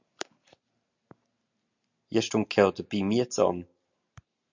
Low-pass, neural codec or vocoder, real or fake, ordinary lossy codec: 7.2 kHz; none; real; MP3, 64 kbps